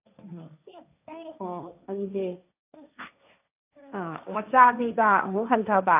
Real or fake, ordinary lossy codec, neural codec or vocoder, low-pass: fake; AAC, 32 kbps; codec, 16 kHz, 1.1 kbps, Voila-Tokenizer; 3.6 kHz